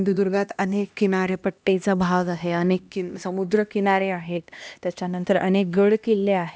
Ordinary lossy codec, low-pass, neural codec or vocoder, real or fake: none; none; codec, 16 kHz, 1 kbps, X-Codec, HuBERT features, trained on LibriSpeech; fake